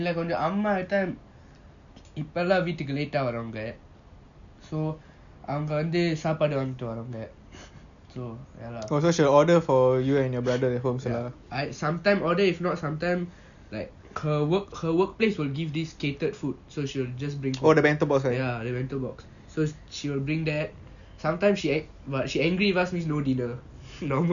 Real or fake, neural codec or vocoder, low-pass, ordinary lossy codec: real; none; 7.2 kHz; none